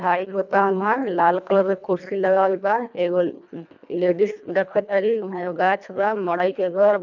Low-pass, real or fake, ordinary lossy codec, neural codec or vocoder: 7.2 kHz; fake; none; codec, 24 kHz, 1.5 kbps, HILCodec